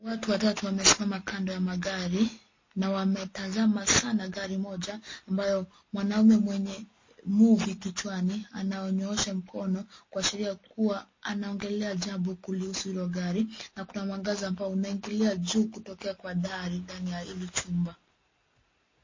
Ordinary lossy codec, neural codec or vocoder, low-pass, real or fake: MP3, 32 kbps; none; 7.2 kHz; real